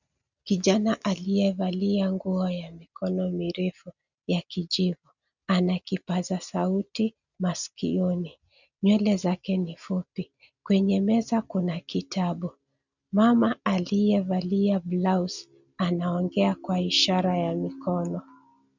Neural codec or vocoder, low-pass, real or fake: none; 7.2 kHz; real